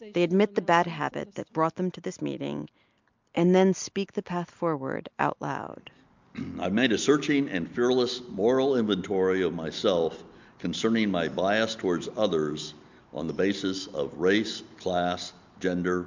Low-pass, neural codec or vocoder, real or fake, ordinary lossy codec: 7.2 kHz; none; real; MP3, 64 kbps